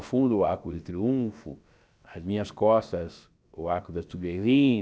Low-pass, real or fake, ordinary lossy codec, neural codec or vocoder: none; fake; none; codec, 16 kHz, about 1 kbps, DyCAST, with the encoder's durations